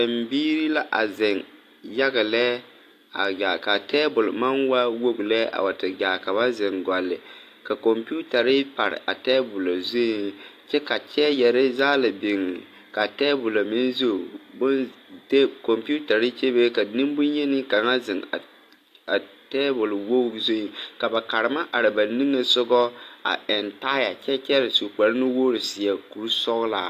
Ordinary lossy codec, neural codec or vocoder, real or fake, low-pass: AAC, 64 kbps; none; real; 14.4 kHz